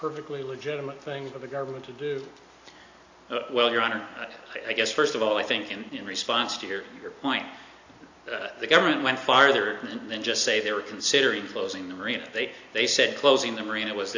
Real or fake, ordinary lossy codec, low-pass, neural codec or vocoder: real; AAC, 48 kbps; 7.2 kHz; none